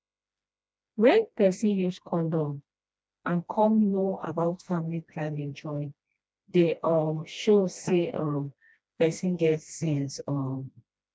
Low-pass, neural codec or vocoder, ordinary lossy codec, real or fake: none; codec, 16 kHz, 1 kbps, FreqCodec, smaller model; none; fake